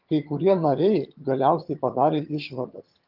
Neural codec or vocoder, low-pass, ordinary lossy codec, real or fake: vocoder, 22.05 kHz, 80 mel bands, HiFi-GAN; 5.4 kHz; Opus, 24 kbps; fake